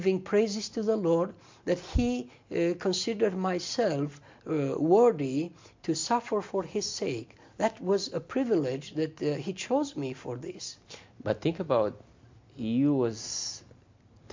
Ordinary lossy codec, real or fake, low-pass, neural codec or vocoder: MP3, 48 kbps; real; 7.2 kHz; none